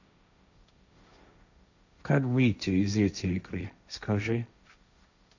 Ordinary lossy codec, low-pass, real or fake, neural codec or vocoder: none; 7.2 kHz; fake; codec, 16 kHz, 1.1 kbps, Voila-Tokenizer